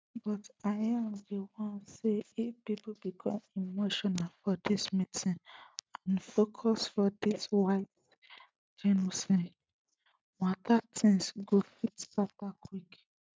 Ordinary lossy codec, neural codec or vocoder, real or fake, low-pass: none; none; real; none